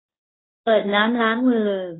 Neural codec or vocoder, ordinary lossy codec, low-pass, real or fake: codec, 24 kHz, 0.9 kbps, WavTokenizer, medium speech release version 1; AAC, 16 kbps; 7.2 kHz; fake